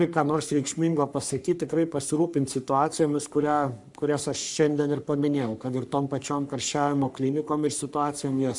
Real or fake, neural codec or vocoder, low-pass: fake; codec, 44.1 kHz, 3.4 kbps, Pupu-Codec; 10.8 kHz